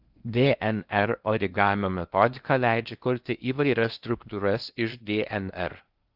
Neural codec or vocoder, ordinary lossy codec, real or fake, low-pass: codec, 16 kHz in and 24 kHz out, 0.6 kbps, FocalCodec, streaming, 4096 codes; Opus, 24 kbps; fake; 5.4 kHz